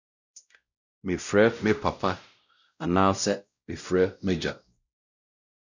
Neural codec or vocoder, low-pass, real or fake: codec, 16 kHz, 0.5 kbps, X-Codec, WavLM features, trained on Multilingual LibriSpeech; 7.2 kHz; fake